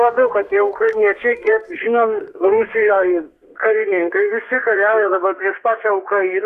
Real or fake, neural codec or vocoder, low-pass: fake; codec, 32 kHz, 1.9 kbps, SNAC; 14.4 kHz